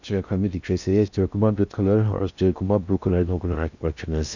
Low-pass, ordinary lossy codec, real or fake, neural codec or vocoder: 7.2 kHz; none; fake; codec, 16 kHz in and 24 kHz out, 0.6 kbps, FocalCodec, streaming, 2048 codes